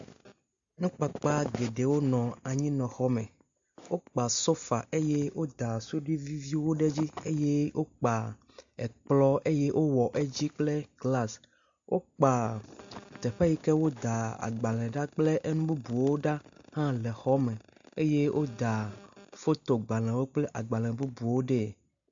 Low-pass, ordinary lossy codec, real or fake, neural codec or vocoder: 7.2 kHz; AAC, 64 kbps; real; none